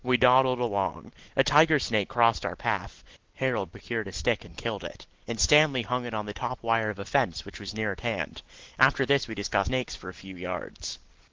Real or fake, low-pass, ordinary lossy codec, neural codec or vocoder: real; 7.2 kHz; Opus, 16 kbps; none